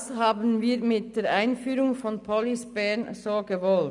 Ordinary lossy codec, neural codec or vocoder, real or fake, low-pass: none; none; real; 10.8 kHz